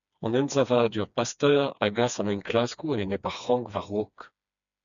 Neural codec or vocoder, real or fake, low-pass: codec, 16 kHz, 2 kbps, FreqCodec, smaller model; fake; 7.2 kHz